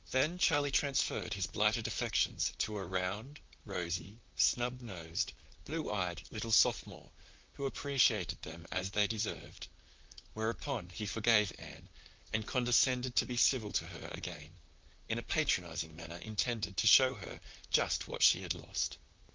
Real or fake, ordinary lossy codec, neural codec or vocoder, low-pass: fake; Opus, 24 kbps; vocoder, 44.1 kHz, 128 mel bands, Pupu-Vocoder; 7.2 kHz